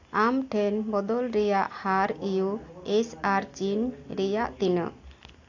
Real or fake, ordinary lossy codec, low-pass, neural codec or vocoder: real; AAC, 48 kbps; 7.2 kHz; none